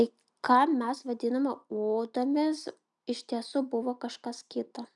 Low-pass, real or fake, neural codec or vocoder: 10.8 kHz; real; none